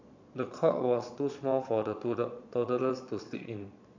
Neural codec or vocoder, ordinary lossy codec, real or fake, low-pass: vocoder, 22.05 kHz, 80 mel bands, Vocos; MP3, 64 kbps; fake; 7.2 kHz